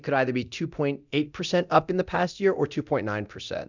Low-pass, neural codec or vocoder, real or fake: 7.2 kHz; codec, 24 kHz, 0.9 kbps, DualCodec; fake